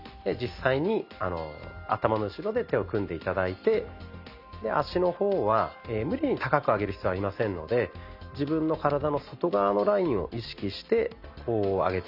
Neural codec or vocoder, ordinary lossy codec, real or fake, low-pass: none; none; real; 5.4 kHz